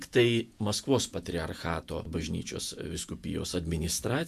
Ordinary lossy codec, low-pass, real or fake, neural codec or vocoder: MP3, 96 kbps; 14.4 kHz; fake; vocoder, 48 kHz, 128 mel bands, Vocos